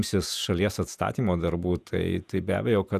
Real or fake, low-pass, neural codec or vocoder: fake; 14.4 kHz; vocoder, 48 kHz, 128 mel bands, Vocos